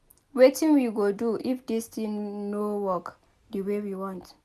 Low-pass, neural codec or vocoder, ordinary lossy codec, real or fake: 14.4 kHz; none; none; real